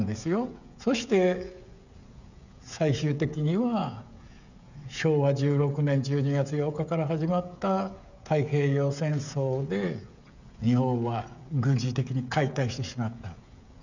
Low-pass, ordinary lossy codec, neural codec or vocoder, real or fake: 7.2 kHz; none; codec, 16 kHz, 16 kbps, FreqCodec, smaller model; fake